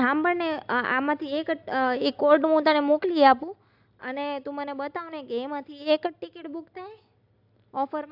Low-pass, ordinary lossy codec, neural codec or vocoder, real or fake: 5.4 kHz; AAC, 48 kbps; none; real